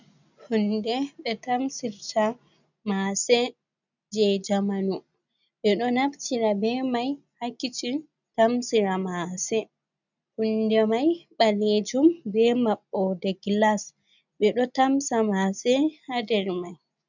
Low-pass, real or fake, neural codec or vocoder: 7.2 kHz; real; none